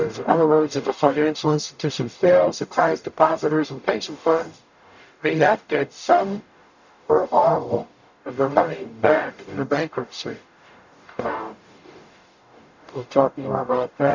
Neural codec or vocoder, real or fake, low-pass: codec, 44.1 kHz, 0.9 kbps, DAC; fake; 7.2 kHz